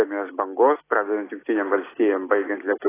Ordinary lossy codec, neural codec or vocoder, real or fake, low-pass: AAC, 16 kbps; none; real; 3.6 kHz